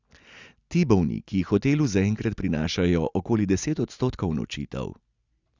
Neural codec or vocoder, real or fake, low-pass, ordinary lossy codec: none; real; 7.2 kHz; Opus, 64 kbps